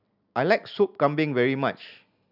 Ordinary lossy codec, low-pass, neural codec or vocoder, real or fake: none; 5.4 kHz; none; real